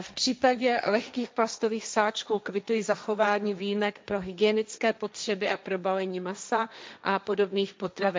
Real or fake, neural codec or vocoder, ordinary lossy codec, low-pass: fake; codec, 16 kHz, 1.1 kbps, Voila-Tokenizer; none; none